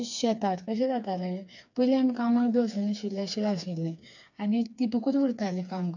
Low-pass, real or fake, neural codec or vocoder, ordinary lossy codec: 7.2 kHz; fake; codec, 16 kHz, 4 kbps, FreqCodec, smaller model; none